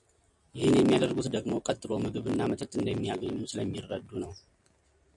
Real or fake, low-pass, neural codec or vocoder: real; 10.8 kHz; none